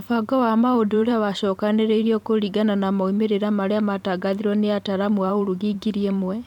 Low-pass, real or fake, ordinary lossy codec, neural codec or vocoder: 19.8 kHz; fake; none; vocoder, 44.1 kHz, 128 mel bands every 512 samples, BigVGAN v2